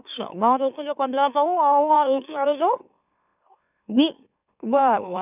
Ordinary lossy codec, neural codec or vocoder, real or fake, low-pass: none; autoencoder, 44.1 kHz, a latent of 192 numbers a frame, MeloTTS; fake; 3.6 kHz